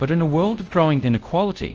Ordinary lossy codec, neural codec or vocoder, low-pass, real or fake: Opus, 24 kbps; codec, 24 kHz, 0.5 kbps, DualCodec; 7.2 kHz; fake